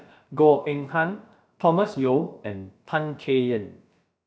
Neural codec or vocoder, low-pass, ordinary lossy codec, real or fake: codec, 16 kHz, about 1 kbps, DyCAST, with the encoder's durations; none; none; fake